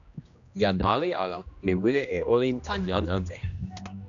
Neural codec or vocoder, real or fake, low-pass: codec, 16 kHz, 1 kbps, X-Codec, HuBERT features, trained on general audio; fake; 7.2 kHz